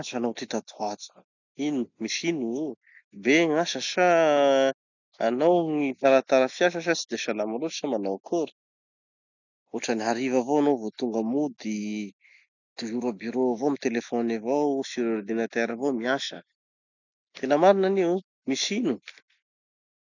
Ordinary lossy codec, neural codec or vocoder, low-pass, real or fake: none; none; 7.2 kHz; real